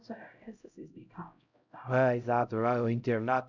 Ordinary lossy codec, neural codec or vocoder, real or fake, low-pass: none; codec, 16 kHz, 0.5 kbps, X-Codec, HuBERT features, trained on LibriSpeech; fake; 7.2 kHz